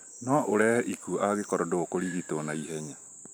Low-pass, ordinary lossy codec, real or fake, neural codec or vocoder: none; none; fake; vocoder, 44.1 kHz, 128 mel bands every 512 samples, BigVGAN v2